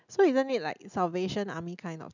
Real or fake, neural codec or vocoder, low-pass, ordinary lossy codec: real; none; 7.2 kHz; none